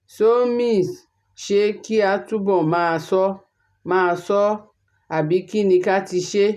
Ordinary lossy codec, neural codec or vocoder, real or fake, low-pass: none; none; real; 14.4 kHz